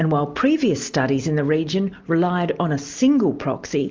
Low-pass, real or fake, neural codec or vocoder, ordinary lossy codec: 7.2 kHz; real; none; Opus, 32 kbps